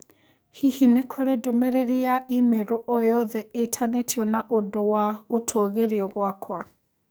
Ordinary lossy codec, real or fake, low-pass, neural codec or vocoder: none; fake; none; codec, 44.1 kHz, 2.6 kbps, SNAC